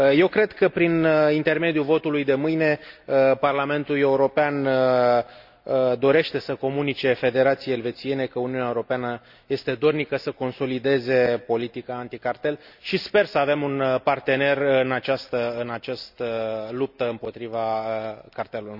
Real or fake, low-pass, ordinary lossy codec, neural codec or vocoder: real; 5.4 kHz; none; none